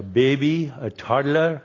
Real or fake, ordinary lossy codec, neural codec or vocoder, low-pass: real; AAC, 32 kbps; none; 7.2 kHz